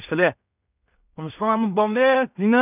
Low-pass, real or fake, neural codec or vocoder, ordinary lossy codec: 3.6 kHz; fake; codec, 16 kHz in and 24 kHz out, 0.4 kbps, LongCat-Audio-Codec, two codebook decoder; none